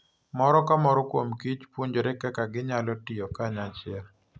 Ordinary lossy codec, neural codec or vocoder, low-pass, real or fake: none; none; none; real